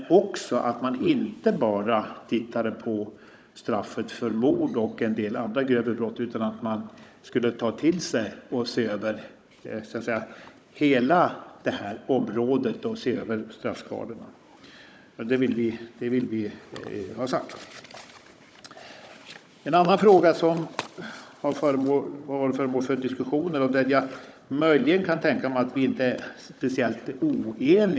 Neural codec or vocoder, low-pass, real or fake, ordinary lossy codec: codec, 16 kHz, 16 kbps, FunCodec, trained on Chinese and English, 50 frames a second; none; fake; none